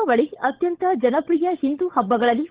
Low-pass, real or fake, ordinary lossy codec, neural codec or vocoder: 3.6 kHz; fake; Opus, 16 kbps; codec, 16 kHz, 16 kbps, FunCodec, trained on LibriTTS, 50 frames a second